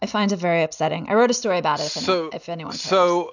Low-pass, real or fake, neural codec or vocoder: 7.2 kHz; real; none